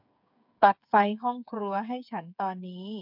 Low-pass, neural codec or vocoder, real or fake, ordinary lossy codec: 5.4 kHz; codec, 16 kHz, 8 kbps, FreqCodec, smaller model; fake; MP3, 48 kbps